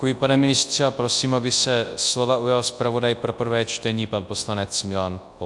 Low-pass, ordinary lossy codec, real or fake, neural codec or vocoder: 10.8 kHz; AAC, 64 kbps; fake; codec, 24 kHz, 0.9 kbps, WavTokenizer, large speech release